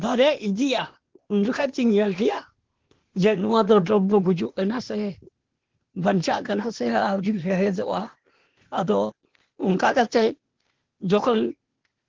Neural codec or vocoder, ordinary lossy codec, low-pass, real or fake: codec, 16 kHz, 0.8 kbps, ZipCodec; Opus, 16 kbps; 7.2 kHz; fake